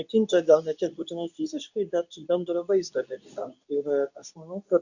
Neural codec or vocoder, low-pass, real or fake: codec, 24 kHz, 0.9 kbps, WavTokenizer, medium speech release version 2; 7.2 kHz; fake